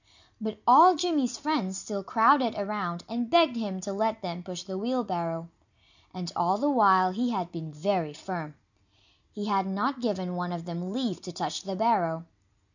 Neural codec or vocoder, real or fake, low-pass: none; real; 7.2 kHz